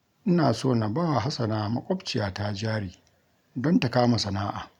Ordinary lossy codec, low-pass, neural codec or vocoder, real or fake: none; 19.8 kHz; vocoder, 44.1 kHz, 128 mel bands every 512 samples, BigVGAN v2; fake